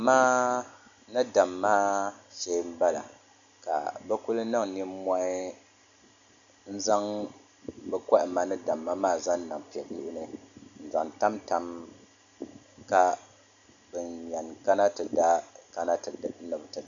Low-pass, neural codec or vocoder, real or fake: 7.2 kHz; none; real